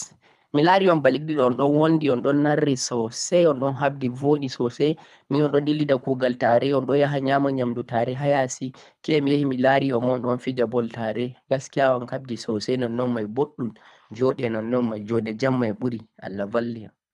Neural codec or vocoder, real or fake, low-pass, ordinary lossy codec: codec, 24 kHz, 3 kbps, HILCodec; fake; none; none